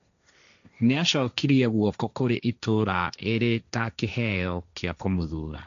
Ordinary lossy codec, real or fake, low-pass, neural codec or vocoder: none; fake; 7.2 kHz; codec, 16 kHz, 1.1 kbps, Voila-Tokenizer